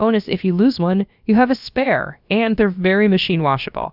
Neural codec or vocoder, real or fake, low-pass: codec, 16 kHz, about 1 kbps, DyCAST, with the encoder's durations; fake; 5.4 kHz